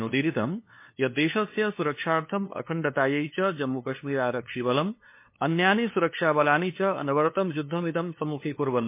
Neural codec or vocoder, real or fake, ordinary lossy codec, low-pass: codec, 16 kHz, 2 kbps, FunCodec, trained on LibriTTS, 25 frames a second; fake; MP3, 24 kbps; 3.6 kHz